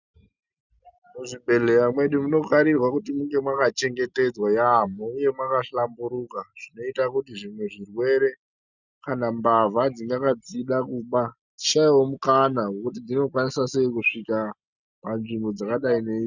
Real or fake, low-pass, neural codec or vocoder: real; 7.2 kHz; none